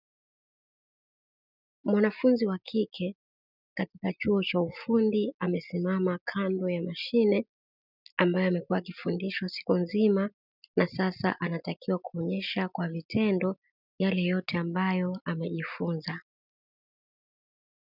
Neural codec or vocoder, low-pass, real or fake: none; 5.4 kHz; real